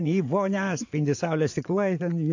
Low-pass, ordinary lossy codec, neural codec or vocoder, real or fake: 7.2 kHz; AAC, 48 kbps; vocoder, 24 kHz, 100 mel bands, Vocos; fake